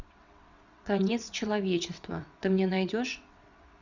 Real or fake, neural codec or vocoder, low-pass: fake; vocoder, 22.05 kHz, 80 mel bands, WaveNeXt; 7.2 kHz